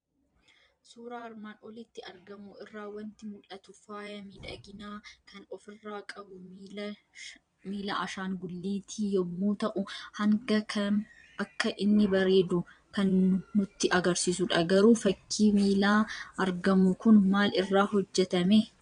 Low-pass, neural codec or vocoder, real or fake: 9.9 kHz; vocoder, 22.05 kHz, 80 mel bands, Vocos; fake